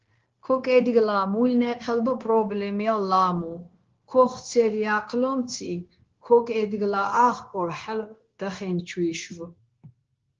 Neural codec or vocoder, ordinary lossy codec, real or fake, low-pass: codec, 16 kHz, 0.9 kbps, LongCat-Audio-Codec; Opus, 16 kbps; fake; 7.2 kHz